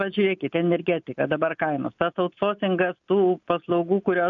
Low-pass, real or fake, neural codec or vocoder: 7.2 kHz; real; none